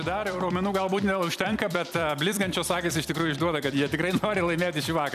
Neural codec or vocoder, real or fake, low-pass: vocoder, 44.1 kHz, 128 mel bands every 256 samples, BigVGAN v2; fake; 14.4 kHz